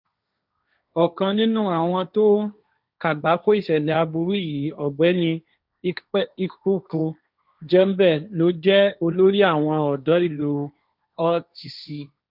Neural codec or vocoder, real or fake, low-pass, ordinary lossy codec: codec, 16 kHz, 1.1 kbps, Voila-Tokenizer; fake; 5.4 kHz; none